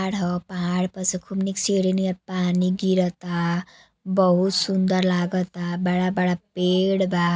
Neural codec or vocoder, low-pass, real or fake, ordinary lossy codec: none; none; real; none